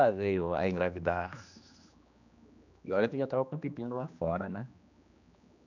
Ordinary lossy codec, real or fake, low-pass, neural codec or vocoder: none; fake; 7.2 kHz; codec, 16 kHz, 2 kbps, X-Codec, HuBERT features, trained on general audio